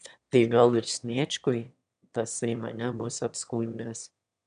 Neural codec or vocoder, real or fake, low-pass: autoencoder, 22.05 kHz, a latent of 192 numbers a frame, VITS, trained on one speaker; fake; 9.9 kHz